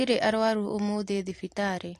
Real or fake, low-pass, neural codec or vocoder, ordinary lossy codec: fake; 14.4 kHz; vocoder, 44.1 kHz, 128 mel bands every 256 samples, BigVGAN v2; AAC, 48 kbps